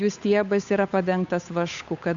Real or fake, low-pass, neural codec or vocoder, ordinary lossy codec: fake; 7.2 kHz; codec, 16 kHz, 8 kbps, FunCodec, trained on Chinese and English, 25 frames a second; AAC, 64 kbps